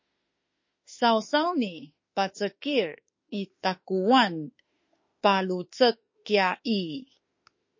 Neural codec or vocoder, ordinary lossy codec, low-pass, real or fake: autoencoder, 48 kHz, 32 numbers a frame, DAC-VAE, trained on Japanese speech; MP3, 32 kbps; 7.2 kHz; fake